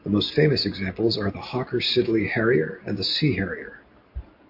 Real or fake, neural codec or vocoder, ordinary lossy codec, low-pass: real; none; MP3, 32 kbps; 5.4 kHz